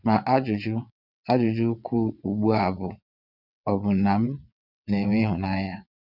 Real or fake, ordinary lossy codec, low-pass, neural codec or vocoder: fake; none; 5.4 kHz; vocoder, 22.05 kHz, 80 mel bands, WaveNeXt